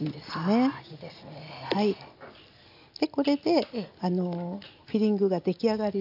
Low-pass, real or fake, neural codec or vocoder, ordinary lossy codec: 5.4 kHz; real; none; AAC, 48 kbps